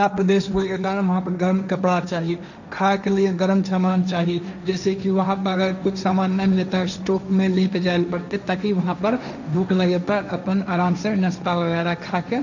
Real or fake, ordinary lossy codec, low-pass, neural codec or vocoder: fake; none; 7.2 kHz; codec, 16 kHz, 1.1 kbps, Voila-Tokenizer